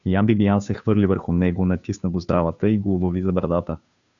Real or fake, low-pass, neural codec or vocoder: fake; 7.2 kHz; codec, 16 kHz, 2 kbps, FunCodec, trained on Chinese and English, 25 frames a second